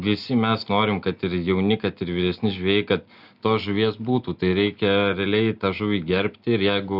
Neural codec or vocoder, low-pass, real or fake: none; 5.4 kHz; real